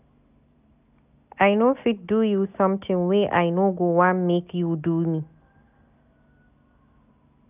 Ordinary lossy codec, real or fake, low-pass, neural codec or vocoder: none; real; 3.6 kHz; none